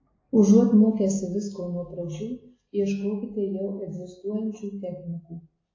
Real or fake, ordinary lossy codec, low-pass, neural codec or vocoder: real; AAC, 32 kbps; 7.2 kHz; none